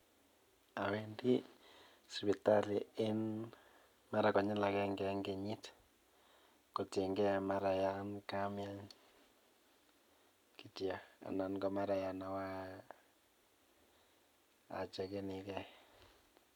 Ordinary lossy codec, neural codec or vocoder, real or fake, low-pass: none; none; real; 19.8 kHz